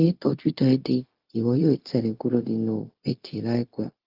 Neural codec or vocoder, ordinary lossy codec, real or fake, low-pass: codec, 16 kHz, 0.4 kbps, LongCat-Audio-Codec; Opus, 32 kbps; fake; 5.4 kHz